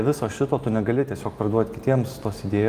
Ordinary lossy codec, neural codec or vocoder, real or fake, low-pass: Opus, 32 kbps; none; real; 14.4 kHz